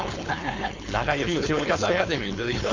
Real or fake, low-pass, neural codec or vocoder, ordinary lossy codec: fake; 7.2 kHz; codec, 16 kHz, 4.8 kbps, FACodec; MP3, 48 kbps